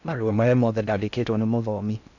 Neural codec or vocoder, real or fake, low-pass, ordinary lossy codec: codec, 16 kHz in and 24 kHz out, 0.6 kbps, FocalCodec, streaming, 2048 codes; fake; 7.2 kHz; none